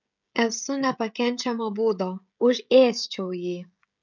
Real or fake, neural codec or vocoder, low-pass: fake; codec, 16 kHz, 16 kbps, FreqCodec, smaller model; 7.2 kHz